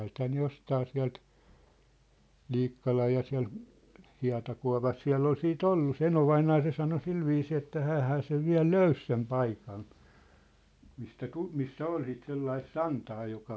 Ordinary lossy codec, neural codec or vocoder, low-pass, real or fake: none; none; none; real